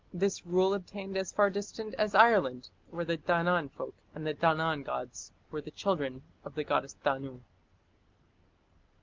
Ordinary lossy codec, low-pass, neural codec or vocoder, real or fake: Opus, 32 kbps; 7.2 kHz; none; real